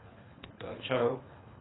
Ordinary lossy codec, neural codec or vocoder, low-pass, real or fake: AAC, 16 kbps; codec, 24 kHz, 3 kbps, HILCodec; 7.2 kHz; fake